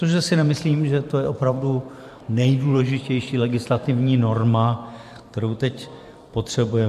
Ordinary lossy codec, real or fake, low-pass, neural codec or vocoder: MP3, 64 kbps; fake; 14.4 kHz; vocoder, 44.1 kHz, 128 mel bands every 512 samples, BigVGAN v2